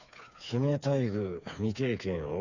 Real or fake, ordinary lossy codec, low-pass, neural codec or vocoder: fake; none; 7.2 kHz; codec, 16 kHz, 4 kbps, FreqCodec, smaller model